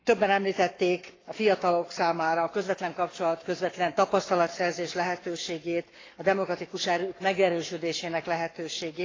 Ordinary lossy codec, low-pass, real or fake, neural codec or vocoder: AAC, 32 kbps; 7.2 kHz; fake; codec, 44.1 kHz, 7.8 kbps, Pupu-Codec